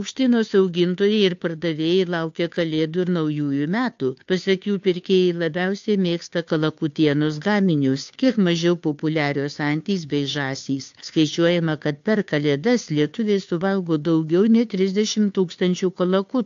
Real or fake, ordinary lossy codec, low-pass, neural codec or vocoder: fake; AAC, 64 kbps; 7.2 kHz; codec, 16 kHz, 2 kbps, FunCodec, trained on Chinese and English, 25 frames a second